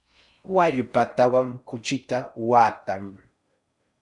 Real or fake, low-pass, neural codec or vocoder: fake; 10.8 kHz; codec, 16 kHz in and 24 kHz out, 0.6 kbps, FocalCodec, streaming, 4096 codes